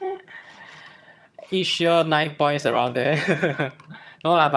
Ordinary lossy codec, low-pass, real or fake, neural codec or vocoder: none; none; fake; vocoder, 22.05 kHz, 80 mel bands, HiFi-GAN